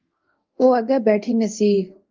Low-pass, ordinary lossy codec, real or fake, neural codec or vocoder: 7.2 kHz; Opus, 32 kbps; fake; codec, 24 kHz, 0.5 kbps, DualCodec